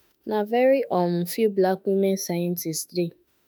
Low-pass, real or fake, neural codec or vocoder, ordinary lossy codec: none; fake; autoencoder, 48 kHz, 32 numbers a frame, DAC-VAE, trained on Japanese speech; none